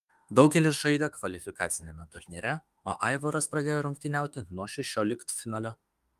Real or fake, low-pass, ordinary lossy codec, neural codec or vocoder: fake; 14.4 kHz; Opus, 32 kbps; autoencoder, 48 kHz, 32 numbers a frame, DAC-VAE, trained on Japanese speech